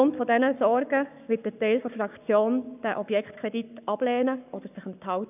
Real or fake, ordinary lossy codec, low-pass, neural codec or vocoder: fake; none; 3.6 kHz; codec, 44.1 kHz, 7.8 kbps, Pupu-Codec